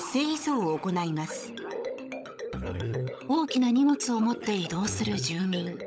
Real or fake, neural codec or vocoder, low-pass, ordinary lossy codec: fake; codec, 16 kHz, 16 kbps, FunCodec, trained on LibriTTS, 50 frames a second; none; none